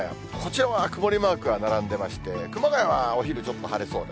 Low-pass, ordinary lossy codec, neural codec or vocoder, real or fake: none; none; none; real